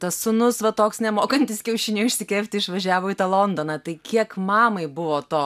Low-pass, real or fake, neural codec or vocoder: 14.4 kHz; real; none